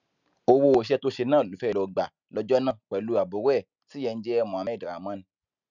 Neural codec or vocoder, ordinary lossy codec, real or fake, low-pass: none; none; real; 7.2 kHz